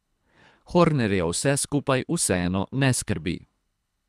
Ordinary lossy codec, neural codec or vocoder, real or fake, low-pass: none; codec, 24 kHz, 3 kbps, HILCodec; fake; none